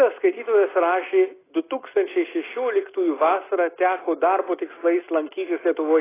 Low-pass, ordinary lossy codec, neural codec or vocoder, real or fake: 3.6 kHz; AAC, 16 kbps; none; real